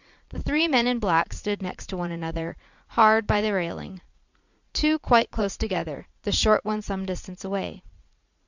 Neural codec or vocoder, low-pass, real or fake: vocoder, 44.1 kHz, 80 mel bands, Vocos; 7.2 kHz; fake